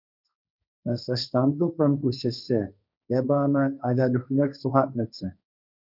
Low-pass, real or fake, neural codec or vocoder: 5.4 kHz; fake; codec, 16 kHz, 1.1 kbps, Voila-Tokenizer